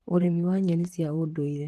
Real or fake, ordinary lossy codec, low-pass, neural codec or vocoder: fake; Opus, 16 kbps; 14.4 kHz; vocoder, 44.1 kHz, 128 mel bands, Pupu-Vocoder